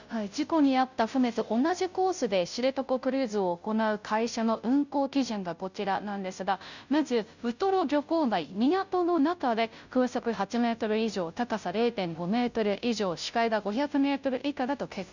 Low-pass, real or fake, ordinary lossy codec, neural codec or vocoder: 7.2 kHz; fake; none; codec, 16 kHz, 0.5 kbps, FunCodec, trained on Chinese and English, 25 frames a second